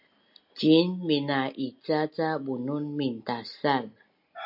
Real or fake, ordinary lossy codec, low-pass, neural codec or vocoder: real; MP3, 48 kbps; 5.4 kHz; none